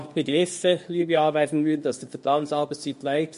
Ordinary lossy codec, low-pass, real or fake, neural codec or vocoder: MP3, 48 kbps; 10.8 kHz; fake; codec, 24 kHz, 0.9 kbps, WavTokenizer, small release